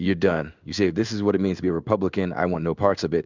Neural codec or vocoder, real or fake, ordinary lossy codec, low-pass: codec, 16 kHz in and 24 kHz out, 1 kbps, XY-Tokenizer; fake; Opus, 64 kbps; 7.2 kHz